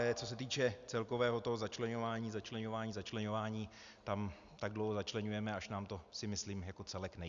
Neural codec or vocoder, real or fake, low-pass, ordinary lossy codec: none; real; 7.2 kHz; Opus, 64 kbps